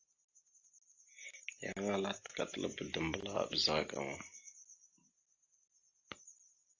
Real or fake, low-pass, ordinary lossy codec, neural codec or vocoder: real; 7.2 kHz; AAC, 48 kbps; none